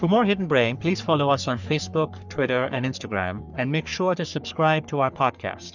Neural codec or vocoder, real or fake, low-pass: codec, 44.1 kHz, 3.4 kbps, Pupu-Codec; fake; 7.2 kHz